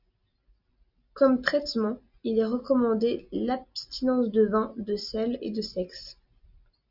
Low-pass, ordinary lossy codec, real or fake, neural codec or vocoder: 5.4 kHz; Opus, 64 kbps; real; none